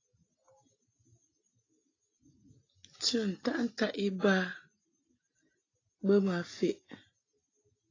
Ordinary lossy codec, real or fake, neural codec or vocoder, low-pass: AAC, 32 kbps; real; none; 7.2 kHz